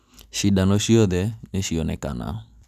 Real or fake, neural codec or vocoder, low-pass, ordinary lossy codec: real; none; 14.4 kHz; none